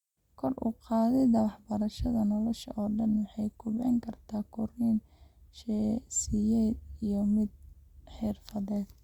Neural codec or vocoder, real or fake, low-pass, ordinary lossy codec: none; real; 19.8 kHz; Opus, 64 kbps